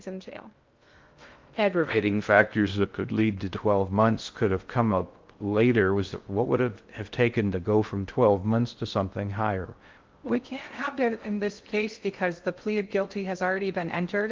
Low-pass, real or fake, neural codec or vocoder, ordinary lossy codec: 7.2 kHz; fake; codec, 16 kHz in and 24 kHz out, 0.6 kbps, FocalCodec, streaming, 2048 codes; Opus, 32 kbps